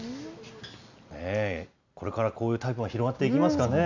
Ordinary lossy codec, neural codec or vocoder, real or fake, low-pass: none; none; real; 7.2 kHz